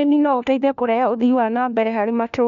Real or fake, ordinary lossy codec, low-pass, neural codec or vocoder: fake; none; 7.2 kHz; codec, 16 kHz, 1 kbps, FunCodec, trained on LibriTTS, 50 frames a second